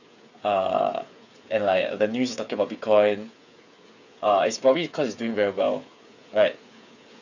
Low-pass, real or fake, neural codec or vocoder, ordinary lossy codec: 7.2 kHz; fake; codec, 16 kHz, 8 kbps, FreqCodec, smaller model; none